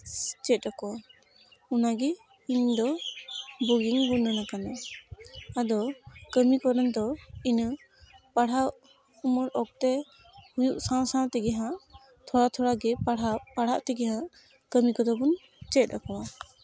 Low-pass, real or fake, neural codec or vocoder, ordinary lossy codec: none; real; none; none